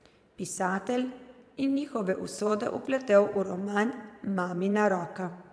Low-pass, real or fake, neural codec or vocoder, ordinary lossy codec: none; fake; vocoder, 22.05 kHz, 80 mel bands, Vocos; none